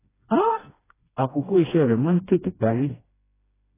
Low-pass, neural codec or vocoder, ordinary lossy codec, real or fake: 3.6 kHz; codec, 16 kHz, 1 kbps, FreqCodec, smaller model; AAC, 16 kbps; fake